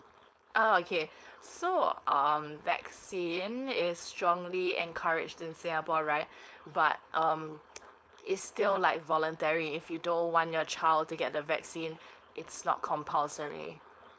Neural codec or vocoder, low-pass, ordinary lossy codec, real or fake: codec, 16 kHz, 4.8 kbps, FACodec; none; none; fake